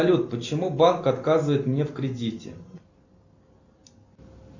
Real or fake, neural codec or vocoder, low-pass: real; none; 7.2 kHz